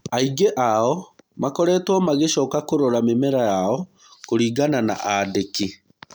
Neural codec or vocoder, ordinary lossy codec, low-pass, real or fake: none; none; none; real